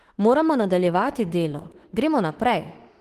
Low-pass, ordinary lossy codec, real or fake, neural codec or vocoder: 14.4 kHz; Opus, 16 kbps; fake; autoencoder, 48 kHz, 32 numbers a frame, DAC-VAE, trained on Japanese speech